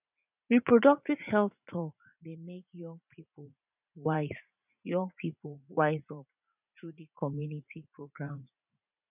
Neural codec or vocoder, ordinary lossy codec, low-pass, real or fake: vocoder, 44.1 kHz, 80 mel bands, Vocos; none; 3.6 kHz; fake